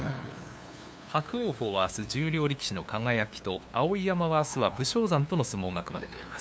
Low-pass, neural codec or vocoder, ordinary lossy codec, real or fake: none; codec, 16 kHz, 2 kbps, FunCodec, trained on LibriTTS, 25 frames a second; none; fake